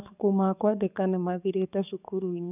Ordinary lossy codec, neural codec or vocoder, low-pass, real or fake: none; codec, 44.1 kHz, 7.8 kbps, DAC; 3.6 kHz; fake